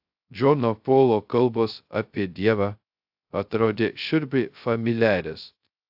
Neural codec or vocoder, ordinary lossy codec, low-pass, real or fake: codec, 16 kHz, 0.2 kbps, FocalCodec; AAC, 48 kbps; 5.4 kHz; fake